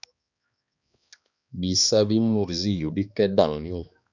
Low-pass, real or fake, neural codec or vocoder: 7.2 kHz; fake; codec, 16 kHz, 2 kbps, X-Codec, HuBERT features, trained on balanced general audio